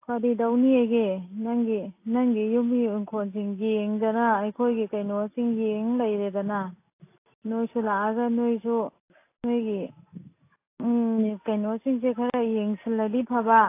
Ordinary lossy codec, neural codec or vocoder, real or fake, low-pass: AAC, 24 kbps; none; real; 3.6 kHz